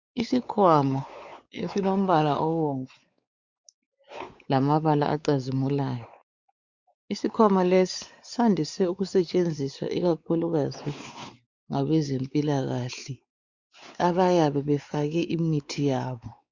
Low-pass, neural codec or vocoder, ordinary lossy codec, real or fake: 7.2 kHz; codec, 16 kHz, 4 kbps, X-Codec, WavLM features, trained on Multilingual LibriSpeech; Opus, 64 kbps; fake